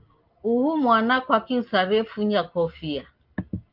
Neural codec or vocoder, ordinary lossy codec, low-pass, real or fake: none; Opus, 32 kbps; 5.4 kHz; real